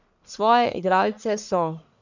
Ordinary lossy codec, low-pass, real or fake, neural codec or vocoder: none; 7.2 kHz; fake; codec, 44.1 kHz, 1.7 kbps, Pupu-Codec